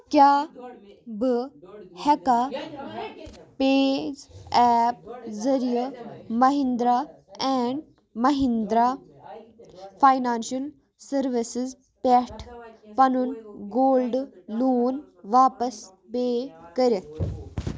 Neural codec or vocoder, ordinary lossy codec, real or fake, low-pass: none; none; real; none